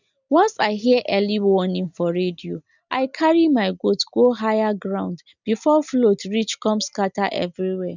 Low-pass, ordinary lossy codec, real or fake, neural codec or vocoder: 7.2 kHz; none; real; none